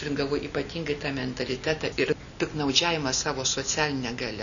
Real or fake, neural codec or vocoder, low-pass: real; none; 7.2 kHz